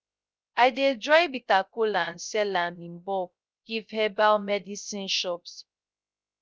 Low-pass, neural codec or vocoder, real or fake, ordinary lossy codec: none; codec, 16 kHz, 0.3 kbps, FocalCodec; fake; none